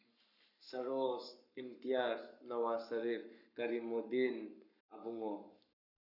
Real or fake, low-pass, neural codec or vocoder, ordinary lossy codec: fake; 5.4 kHz; codec, 44.1 kHz, 7.8 kbps, Pupu-Codec; none